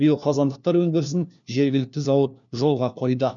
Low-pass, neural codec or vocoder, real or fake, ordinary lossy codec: 7.2 kHz; codec, 16 kHz, 1 kbps, FunCodec, trained on LibriTTS, 50 frames a second; fake; none